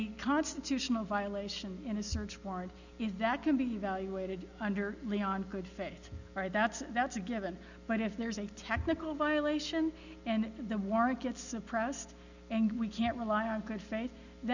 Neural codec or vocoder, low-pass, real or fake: none; 7.2 kHz; real